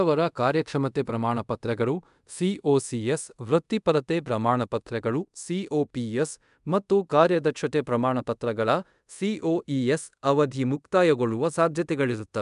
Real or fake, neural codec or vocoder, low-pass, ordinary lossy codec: fake; codec, 24 kHz, 0.5 kbps, DualCodec; 10.8 kHz; MP3, 96 kbps